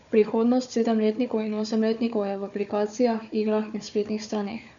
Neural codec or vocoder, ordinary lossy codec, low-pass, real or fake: codec, 16 kHz, 4 kbps, FunCodec, trained on Chinese and English, 50 frames a second; none; 7.2 kHz; fake